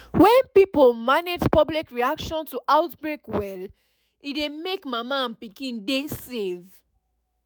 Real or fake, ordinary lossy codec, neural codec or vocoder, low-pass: fake; none; autoencoder, 48 kHz, 128 numbers a frame, DAC-VAE, trained on Japanese speech; none